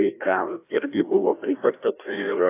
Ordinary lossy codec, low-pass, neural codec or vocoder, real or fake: AAC, 24 kbps; 3.6 kHz; codec, 16 kHz, 1 kbps, FreqCodec, larger model; fake